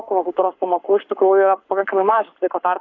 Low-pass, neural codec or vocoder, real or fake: 7.2 kHz; codec, 44.1 kHz, 7.8 kbps, Pupu-Codec; fake